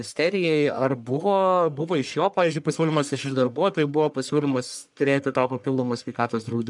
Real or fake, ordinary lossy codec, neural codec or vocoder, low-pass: fake; MP3, 96 kbps; codec, 44.1 kHz, 1.7 kbps, Pupu-Codec; 10.8 kHz